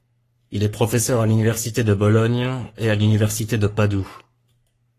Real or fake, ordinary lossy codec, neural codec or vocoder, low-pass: fake; AAC, 48 kbps; codec, 44.1 kHz, 3.4 kbps, Pupu-Codec; 14.4 kHz